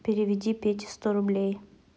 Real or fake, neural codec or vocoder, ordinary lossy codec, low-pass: real; none; none; none